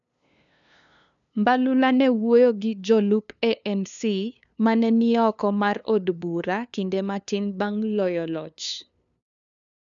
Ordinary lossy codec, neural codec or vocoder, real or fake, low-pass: none; codec, 16 kHz, 2 kbps, FunCodec, trained on LibriTTS, 25 frames a second; fake; 7.2 kHz